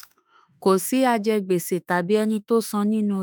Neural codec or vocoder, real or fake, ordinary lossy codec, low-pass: autoencoder, 48 kHz, 32 numbers a frame, DAC-VAE, trained on Japanese speech; fake; none; none